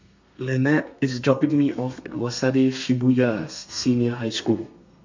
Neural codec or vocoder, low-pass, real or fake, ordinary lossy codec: codec, 32 kHz, 1.9 kbps, SNAC; 7.2 kHz; fake; MP3, 64 kbps